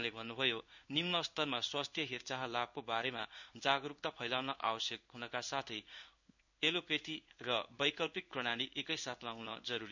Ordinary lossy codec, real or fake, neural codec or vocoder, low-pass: none; fake; codec, 16 kHz in and 24 kHz out, 1 kbps, XY-Tokenizer; 7.2 kHz